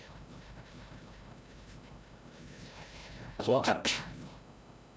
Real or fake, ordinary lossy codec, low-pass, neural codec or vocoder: fake; none; none; codec, 16 kHz, 0.5 kbps, FreqCodec, larger model